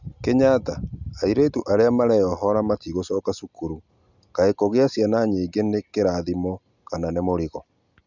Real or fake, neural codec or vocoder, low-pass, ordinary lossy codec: real; none; 7.2 kHz; none